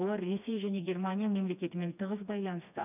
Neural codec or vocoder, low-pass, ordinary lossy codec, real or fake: codec, 16 kHz, 2 kbps, FreqCodec, smaller model; 3.6 kHz; none; fake